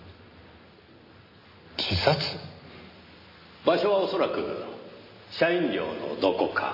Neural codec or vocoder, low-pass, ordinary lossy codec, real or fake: none; 5.4 kHz; MP3, 24 kbps; real